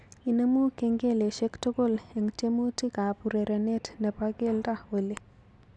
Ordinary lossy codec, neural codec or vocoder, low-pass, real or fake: none; none; none; real